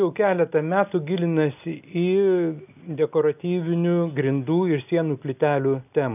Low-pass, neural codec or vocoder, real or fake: 3.6 kHz; none; real